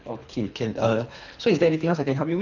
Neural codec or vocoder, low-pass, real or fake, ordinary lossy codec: codec, 24 kHz, 3 kbps, HILCodec; 7.2 kHz; fake; none